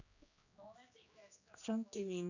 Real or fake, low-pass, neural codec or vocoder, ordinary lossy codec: fake; 7.2 kHz; codec, 16 kHz, 2 kbps, X-Codec, HuBERT features, trained on general audio; none